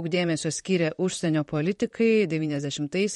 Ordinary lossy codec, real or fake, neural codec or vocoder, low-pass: MP3, 48 kbps; fake; vocoder, 44.1 kHz, 128 mel bands, Pupu-Vocoder; 19.8 kHz